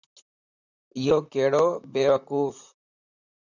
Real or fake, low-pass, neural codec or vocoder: fake; 7.2 kHz; vocoder, 44.1 kHz, 128 mel bands, Pupu-Vocoder